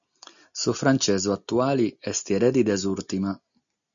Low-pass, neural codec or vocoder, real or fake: 7.2 kHz; none; real